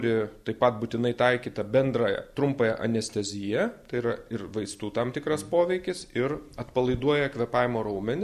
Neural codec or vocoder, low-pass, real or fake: none; 14.4 kHz; real